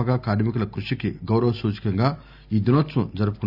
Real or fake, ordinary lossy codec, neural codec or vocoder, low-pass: real; none; none; 5.4 kHz